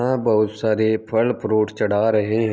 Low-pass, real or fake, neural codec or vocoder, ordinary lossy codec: none; real; none; none